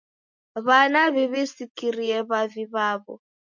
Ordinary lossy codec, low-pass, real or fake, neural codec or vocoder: MP3, 48 kbps; 7.2 kHz; real; none